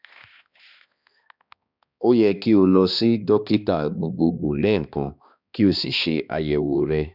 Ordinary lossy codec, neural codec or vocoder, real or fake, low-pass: none; codec, 16 kHz, 2 kbps, X-Codec, HuBERT features, trained on balanced general audio; fake; 5.4 kHz